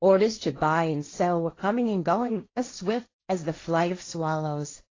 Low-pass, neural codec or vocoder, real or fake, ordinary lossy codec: 7.2 kHz; codec, 16 kHz, 1.1 kbps, Voila-Tokenizer; fake; AAC, 32 kbps